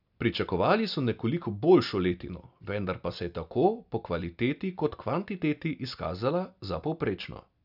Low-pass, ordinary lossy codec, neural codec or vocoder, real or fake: 5.4 kHz; none; none; real